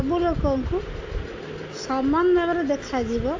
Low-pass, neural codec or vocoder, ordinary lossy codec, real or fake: 7.2 kHz; none; AAC, 48 kbps; real